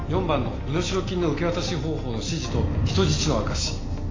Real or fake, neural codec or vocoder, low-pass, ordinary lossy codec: real; none; 7.2 kHz; AAC, 32 kbps